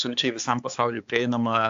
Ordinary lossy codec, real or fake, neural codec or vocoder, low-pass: AAC, 64 kbps; fake; codec, 16 kHz, 4 kbps, X-Codec, HuBERT features, trained on balanced general audio; 7.2 kHz